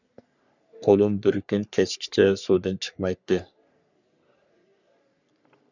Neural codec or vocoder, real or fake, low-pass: codec, 44.1 kHz, 3.4 kbps, Pupu-Codec; fake; 7.2 kHz